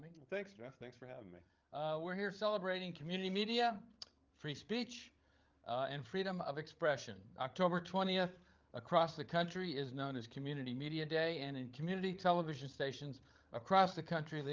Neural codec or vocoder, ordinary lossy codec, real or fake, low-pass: codec, 16 kHz, 8 kbps, FreqCodec, larger model; Opus, 32 kbps; fake; 7.2 kHz